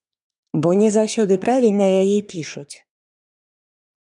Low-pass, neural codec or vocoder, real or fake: 10.8 kHz; codec, 24 kHz, 1 kbps, SNAC; fake